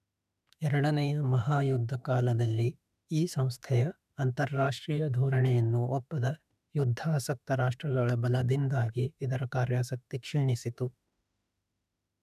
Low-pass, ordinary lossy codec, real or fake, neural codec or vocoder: 14.4 kHz; none; fake; autoencoder, 48 kHz, 32 numbers a frame, DAC-VAE, trained on Japanese speech